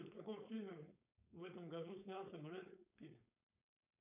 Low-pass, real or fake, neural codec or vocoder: 3.6 kHz; fake; codec, 16 kHz, 4.8 kbps, FACodec